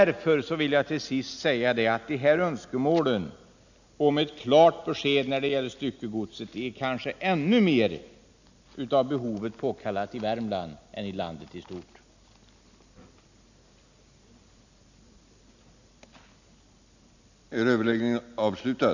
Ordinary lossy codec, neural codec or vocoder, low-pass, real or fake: none; none; 7.2 kHz; real